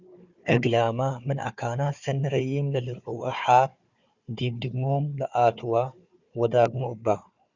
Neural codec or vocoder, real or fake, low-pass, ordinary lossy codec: codec, 16 kHz, 4 kbps, FunCodec, trained on Chinese and English, 50 frames a second; fake; 7.2 kHz; Opus, 64 kbps